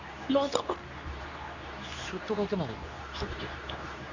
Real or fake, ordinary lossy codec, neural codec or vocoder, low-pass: fake; none; codec, 24 kHz, 0.9 kbps, WavTokenizer, medium speech release version 2; 7.2 kHz